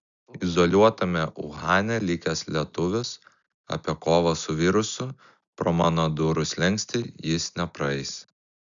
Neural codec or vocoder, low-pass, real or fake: none; 7.2 kHz; real